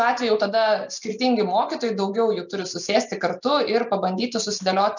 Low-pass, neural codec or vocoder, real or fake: 7.2 kHz; none; real